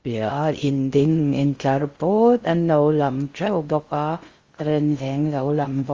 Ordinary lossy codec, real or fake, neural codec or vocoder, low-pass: Opus, 32 kbps; fake; codec, 16 kHz in and 24 kHz out, 0.6 kbps, FocalCodec, streaming, 4096 codes; 7.2 kHz